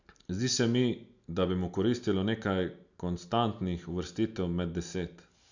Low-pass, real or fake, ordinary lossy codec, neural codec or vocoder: 7.2 kHz; real; none; none